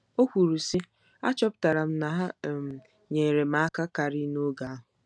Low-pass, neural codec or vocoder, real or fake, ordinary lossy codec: none; none; real; none